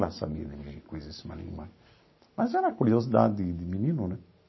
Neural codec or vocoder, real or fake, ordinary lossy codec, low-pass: none; real; MP3, 24 kbps; 7.2 kHz